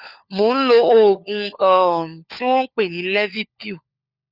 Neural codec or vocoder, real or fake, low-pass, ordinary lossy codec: codec, 16 kHz, 4 kbps, FunCodec, trained on LibriTTS, 50 frames a second; fake; 5.4 kHz; Opus, 64 kbps